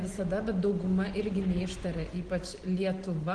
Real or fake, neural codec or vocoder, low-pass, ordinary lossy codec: real; none; 9.9 kHz; Opus, 16 kbps